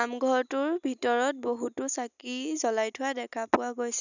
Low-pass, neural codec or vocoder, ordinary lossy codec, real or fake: 7.2 kHz; none; none; real